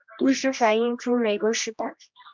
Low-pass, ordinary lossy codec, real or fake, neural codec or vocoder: 7.2 kHz; MP3, 64 kbps; fake; codec, 16 kHz, 1 kbps, X-Codec, HuBERT features, trained on general audio